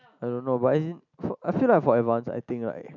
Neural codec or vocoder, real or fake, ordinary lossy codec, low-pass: none; real; none; 7.2 kHz